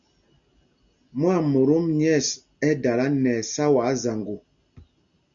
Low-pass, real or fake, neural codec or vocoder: 7.2 kHz; real; none